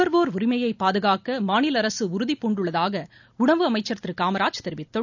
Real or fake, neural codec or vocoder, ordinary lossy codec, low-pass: real; none; none; 7.2 kHz